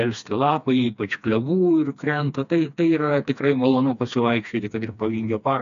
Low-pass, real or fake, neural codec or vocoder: 7.2 kHz; fake; codec, 16 kHz, 2 kbps, FreqCodec, smaller model